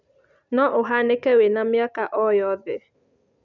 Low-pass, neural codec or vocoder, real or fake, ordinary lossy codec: 7.2 kHz; none; real; none